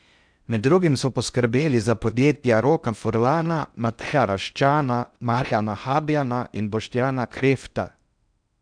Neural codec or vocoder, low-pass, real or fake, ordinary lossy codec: codec, 16 kHz in and 24 kHz out, 0.6 kbps, FocalCodec, streaming, 4096 codes; 9.9 kHz; fake; none